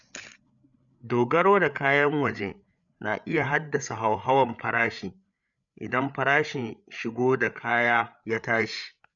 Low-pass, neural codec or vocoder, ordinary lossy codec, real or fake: 7.2 kHz; codec, 16 kHz, 8 kbps, FreqCodec, larger model; none; fake